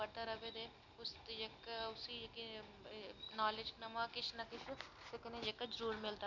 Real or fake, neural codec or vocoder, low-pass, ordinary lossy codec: real; none; 7.2 kHz; none